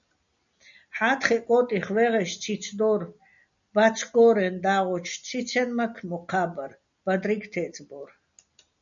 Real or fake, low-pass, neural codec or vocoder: real; 7.2 kHz; none